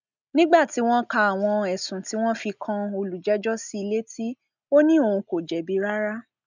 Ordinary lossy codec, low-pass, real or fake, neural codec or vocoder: none; 7.2 kHz; real; none